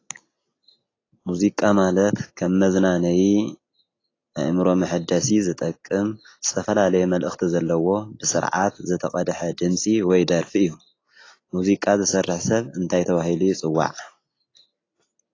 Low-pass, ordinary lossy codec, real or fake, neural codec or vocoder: 7.2 kHz; AAC, 32 kbps; real; none